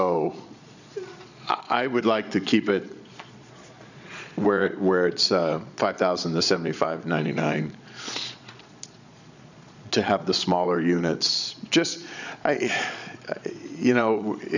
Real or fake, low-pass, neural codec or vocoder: fake; 7.2 kHz; vocoder, 22.05 kHz, 80 mel bands, Vocos